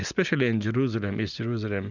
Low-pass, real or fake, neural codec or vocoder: 7.2 kHz; real; none